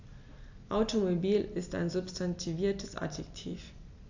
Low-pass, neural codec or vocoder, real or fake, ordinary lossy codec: 7.2 kHz; none; real; AAC, 48 kbps